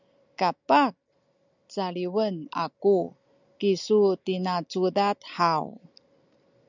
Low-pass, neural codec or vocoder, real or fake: 7.2 kHz; none; real